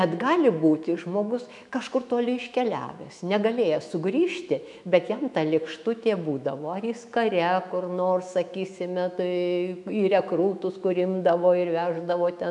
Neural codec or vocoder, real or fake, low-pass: autoencoder, 48 kHz, 128 numbers a frame, DAC-VAE, trained on Japanese speech; fake; 10.8 kHz